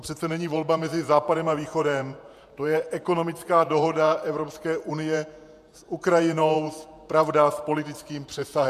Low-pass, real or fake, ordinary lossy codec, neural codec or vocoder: 14.4 kHz; fake; MP3, 96 kbps; vocoder, 48 kHz, 128 mel bands, Vocos